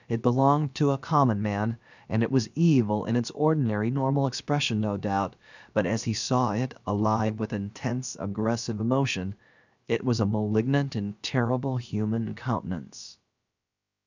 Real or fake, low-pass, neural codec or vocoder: fake; 7.2 kHz; codec, 16 kHz, about 1 kbps, DyCAST, with the encoder's durations